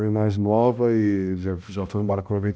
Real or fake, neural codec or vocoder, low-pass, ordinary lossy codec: fake; codec, 16 kHz, 1 kbps, X-Codec, HuBERT features, trained on balanced general audio; none; none